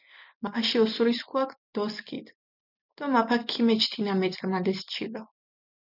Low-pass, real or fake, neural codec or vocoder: 5.4 kHz; real; none